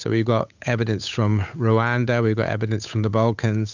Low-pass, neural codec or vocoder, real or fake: 7.2 kHz; codec, 16 kHz, 8 kbps, FunCodec, trained on Chinese and English, 25 frames a second; fake